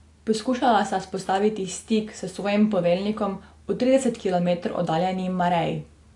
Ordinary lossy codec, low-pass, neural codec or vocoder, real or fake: AAC, 48 kbps; 10.8 kHz; none; real